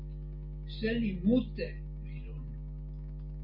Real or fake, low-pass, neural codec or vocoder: real; 5.4 kHz; none